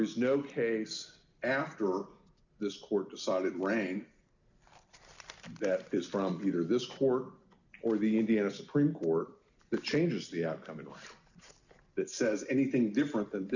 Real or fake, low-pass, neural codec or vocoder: real; 7.2 kHz; none